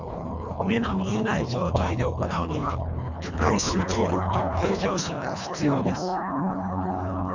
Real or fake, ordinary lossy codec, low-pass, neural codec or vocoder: fake; none; 7.2 kHz; codec, 24 kHz, 1.5 kbps, HILCodec